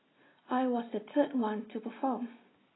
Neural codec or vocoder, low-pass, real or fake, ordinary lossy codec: none; 7.2 kHz; real; AAC, 16 kbps